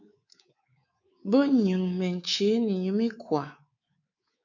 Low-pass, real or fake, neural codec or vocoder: 7.2 kHz; fake; codec, 24 kHz, 3.1 kbps, DualCodec